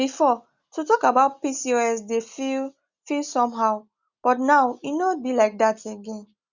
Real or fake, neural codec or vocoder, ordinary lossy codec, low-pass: real; none; Opus, 64 kbps; 7.2 kHz